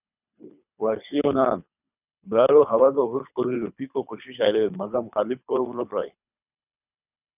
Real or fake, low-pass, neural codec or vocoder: fake; 3.6 kHz; codec, 24 kHz, 3 kbps, HILCodec